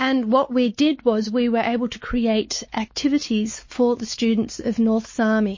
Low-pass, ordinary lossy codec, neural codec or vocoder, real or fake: 7.2 kHz; MP3, 32 kbps; codec, 16 kHz, 4 kbps, X-Codec, WavLM features, trained on Multilingual LibriSpeech; fake